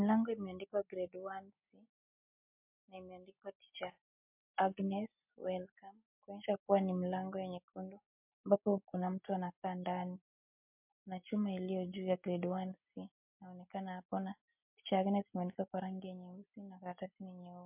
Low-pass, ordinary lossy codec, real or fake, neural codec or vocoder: 3.6 kHz; MP3, 32 kbps; real; none